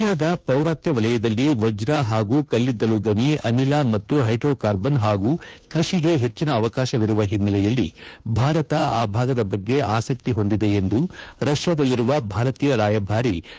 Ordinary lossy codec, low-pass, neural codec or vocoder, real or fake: none; none; codec, 16 kHz, 2 kbps, FunCodec, trained on Chinese and English, 25 frames a second; fake